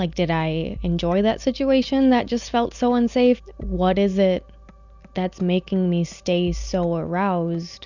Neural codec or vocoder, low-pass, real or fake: none; 7.2 kHz; real